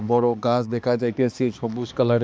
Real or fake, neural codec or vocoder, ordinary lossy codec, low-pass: fake; codec, 16 kHz, 2 kbps, X-Codec, HuBERT features, trained on balanced general audio; none; none